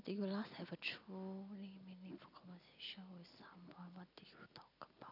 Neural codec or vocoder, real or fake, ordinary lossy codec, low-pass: none; real; none; 5.4 kHz